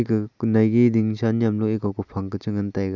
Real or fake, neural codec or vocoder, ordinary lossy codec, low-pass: real; none; none; 7.2 kHz